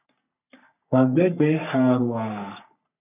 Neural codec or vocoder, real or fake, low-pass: codec, 44.1 kHz, 3.4 kbps, Pupu-Codec; fake; 3.6 kHz